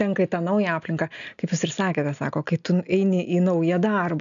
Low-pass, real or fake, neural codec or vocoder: 7.2 kHz; real; none